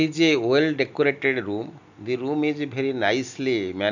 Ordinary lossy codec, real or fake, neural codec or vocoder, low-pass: none; real; none; 7.2 kHz